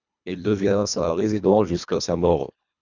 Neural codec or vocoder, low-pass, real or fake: codec, 24 kHz, 1.5 kbps, HILCodec; 7.2 kHz; fake